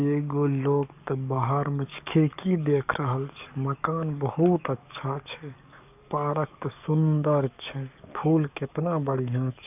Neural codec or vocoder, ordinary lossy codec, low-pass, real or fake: codec, 44.1 kHz, 7.8 kbps, DAC; none; 3.6 kHz; fake